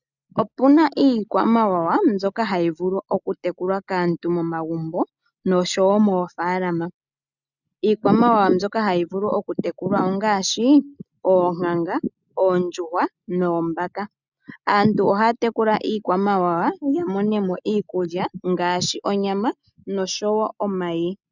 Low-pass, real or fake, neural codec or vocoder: 7.2 kHz; real; none